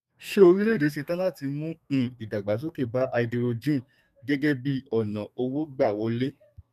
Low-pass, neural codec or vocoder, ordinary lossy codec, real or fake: 14.4 kHz; codec, 32 kHz, 1.9 kbps, SNAC; none; fake